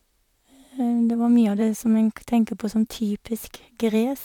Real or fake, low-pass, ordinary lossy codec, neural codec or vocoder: fake; 19.8 kHz; none; vocoder, 44.1 kHz, 128 mel bands, Pupu-Vocoder